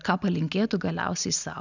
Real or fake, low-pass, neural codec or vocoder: real; 7.2 kHz; none